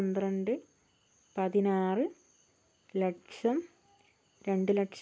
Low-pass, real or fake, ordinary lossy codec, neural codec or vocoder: none; real; none; none